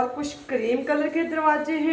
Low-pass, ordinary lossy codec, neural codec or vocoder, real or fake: none; none; none; real